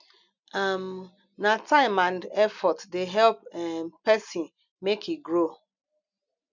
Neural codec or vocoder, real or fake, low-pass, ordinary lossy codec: none; real; 7.2 kHz; none